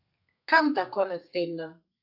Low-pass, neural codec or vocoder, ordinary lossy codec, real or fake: 5.4 kHz; codec, 32 kHz, 1.9 kbps, SNAC; AAC, 48 kbps; fake